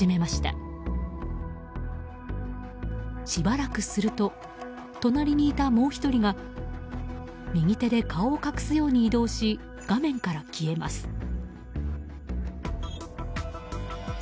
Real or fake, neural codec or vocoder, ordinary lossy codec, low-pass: real; none; none; none